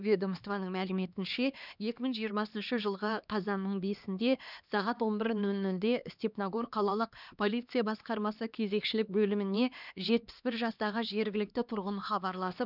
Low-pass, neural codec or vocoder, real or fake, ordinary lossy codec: 5.4 kHz; codec, 16 kHz, 2 kbps, X-Codec, HuBERT features, trained on LibriSpeech; fake; none